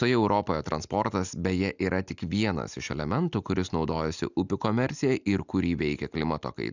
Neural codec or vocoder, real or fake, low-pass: none; real; 7.2 kHz